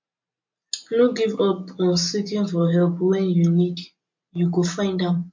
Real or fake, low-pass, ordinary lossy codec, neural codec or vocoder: real; 7.2 kHz; MP3, 48 kbps; none